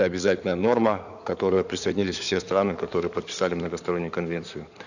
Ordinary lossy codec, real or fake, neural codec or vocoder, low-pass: none; fake; codec, 16 kHz in and 24 kHz out, 2.2 kbps, FireRedTTS-2 codec; 7.2 kHz